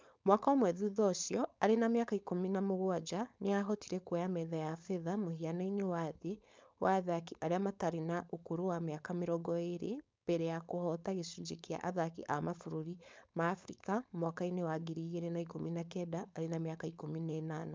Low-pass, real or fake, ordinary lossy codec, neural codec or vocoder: none; fake; none; codec, 16 kHz, 4.8 kbps, FACodec